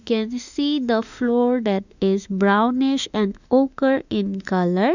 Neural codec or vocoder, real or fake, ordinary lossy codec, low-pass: autoencoder, 48 kHz, 32 numbers a frame, DAC-VAE, trained on Japanese speech; fake; none; 7.2 kHz